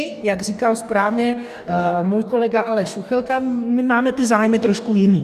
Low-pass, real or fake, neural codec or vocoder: 14.4 kHz; fake; codec, 44.1 kHz, 2.6 kbps, DAC